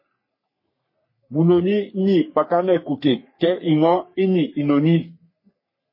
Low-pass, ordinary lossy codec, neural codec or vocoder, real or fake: 5.4 kHz; MP3, 24 kbps; codec, 44.1 kHz, 3.4 kbps, Pupu-Codec; fake